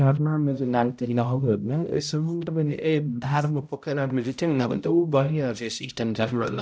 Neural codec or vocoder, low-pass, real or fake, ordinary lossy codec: codec, 16 kHz, 0.5 kbps, X-Codec, HuBERT features, trained on balanced general audio; none; fake; none